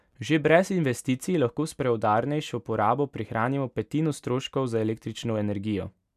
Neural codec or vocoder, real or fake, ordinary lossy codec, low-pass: none; real; none; 14.4 kHz